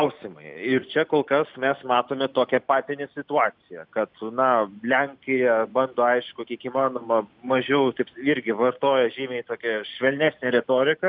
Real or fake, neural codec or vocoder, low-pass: real; none; 5.4 kHz